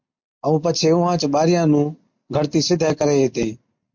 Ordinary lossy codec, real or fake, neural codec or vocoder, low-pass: MP3, 48 kbps; real; none; 7.2 kHz